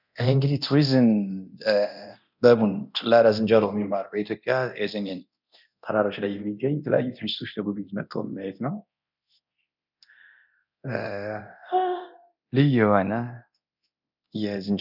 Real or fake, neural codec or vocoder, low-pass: fake; codec, 24 kHz, 0.9 kbps, DualCodec; 5.4 kHz